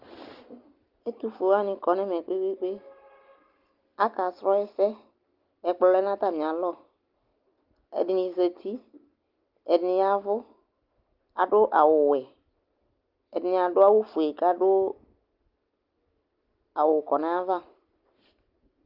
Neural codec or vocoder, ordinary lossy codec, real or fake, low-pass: none; Opus, 32 kbps; real; 5.4 kHz